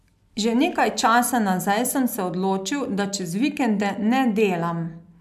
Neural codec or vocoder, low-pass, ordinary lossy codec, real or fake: none; 14.4 kHz; AAC, 96 kbps; real